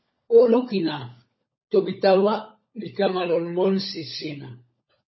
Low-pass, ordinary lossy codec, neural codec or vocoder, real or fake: 7.2 kHz; MP3, 24 kbps; codec, 16 kHz, 16 kbps, FunCodec, trained on LibriTTS, 50 frames a second; fake